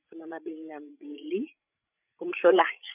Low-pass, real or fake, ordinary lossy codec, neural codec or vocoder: 3.6 kHz; fake; none; codec, 16 kHz, 16 kbps, FreqCodec, larger model